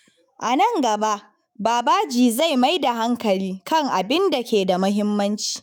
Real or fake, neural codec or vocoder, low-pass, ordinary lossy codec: fake; autoencoder, 48 kHz, 128 numbers a frame, DAC-VAE, trained on Japanese speech; none; none